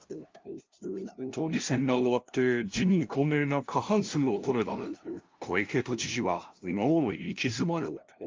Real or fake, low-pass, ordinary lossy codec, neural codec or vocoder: fake; 7.2 kHz; Opus, 24 kbps; codec, 16 kHz, 0.5 kbps, FunCodec, trained on LibriTTS, 25 frames a second